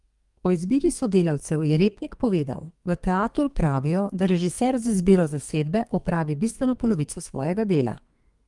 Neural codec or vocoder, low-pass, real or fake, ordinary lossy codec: codec, 32 kHz, 1.9 kbps, SNAC; 10.8 kHz; fake; Opus, 32 kbps